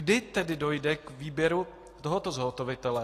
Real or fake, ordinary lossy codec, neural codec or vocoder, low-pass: real; AAC, 48 kbps; none; 14.4 kHz